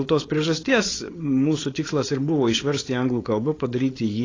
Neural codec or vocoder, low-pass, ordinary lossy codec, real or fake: codec, 16 kHz, 4.8 kbps, FACodec; 7.2 kHz; AAC, 32 kbps; fake